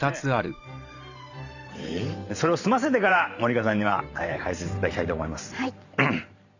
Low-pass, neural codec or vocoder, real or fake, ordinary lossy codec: 7.2 kHz; vocoder, 44.1 kHz, 128 mel bands every 512 samples, BigVGAN v2; fake; none